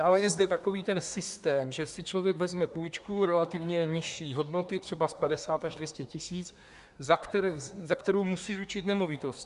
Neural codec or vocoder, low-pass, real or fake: codec, 24 kHz, 1 kbps, SNAC; 10.8 kHz; fake